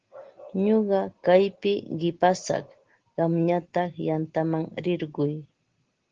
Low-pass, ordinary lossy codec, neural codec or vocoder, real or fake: 7.2 kHz; Opus, 16 kbps; none; real